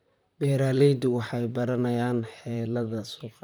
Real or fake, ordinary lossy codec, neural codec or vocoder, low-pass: fake; none; vocoder, 44.1 kHz, 128 mel bands, Pupu-Vocoder; none